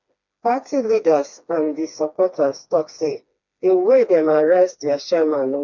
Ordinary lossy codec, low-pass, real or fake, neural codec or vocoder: MP3, 64 kbps; 7.2 kHz; fake; codec, 16 kHz, 2 kbps, FreqCodec, smaller model